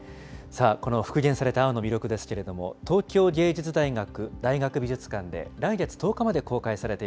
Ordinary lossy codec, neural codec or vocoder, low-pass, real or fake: none; none; none; real